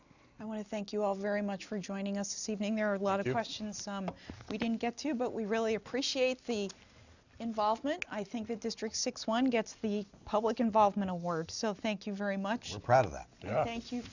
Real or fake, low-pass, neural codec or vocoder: real; 7.2 kHz; none